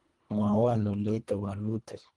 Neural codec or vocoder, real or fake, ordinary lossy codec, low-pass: codec, 24 kHz, 1.5 kbps, HILCodec; fake; Opus, 24 kbps; 10.8 kHz